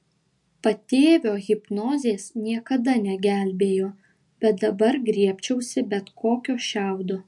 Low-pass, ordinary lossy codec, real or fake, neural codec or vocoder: 10.8 kHz; MP3, 64 kbps; real; none